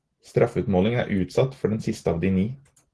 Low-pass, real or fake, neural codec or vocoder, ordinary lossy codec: 10.8 kHz; real; none; Opus, 16 kbps